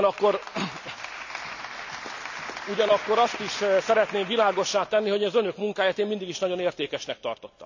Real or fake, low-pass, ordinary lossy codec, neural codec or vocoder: real; 7.2 kHz; none; none